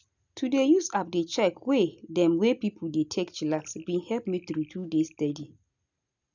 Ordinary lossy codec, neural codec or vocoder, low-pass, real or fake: none; none; 7.2 kHz; real